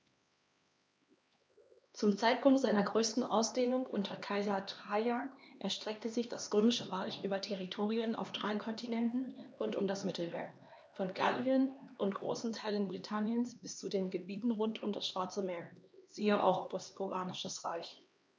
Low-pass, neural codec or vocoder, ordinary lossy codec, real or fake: none; codec, 16 kHz, 2 kbps, X-Codec, HuBERT features, trained on LibriSpeech; none; fake